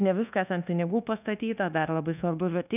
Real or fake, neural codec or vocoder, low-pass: fake; codec, 24 kHz, 1.2 kbps, DualCodec; 3.6 kHz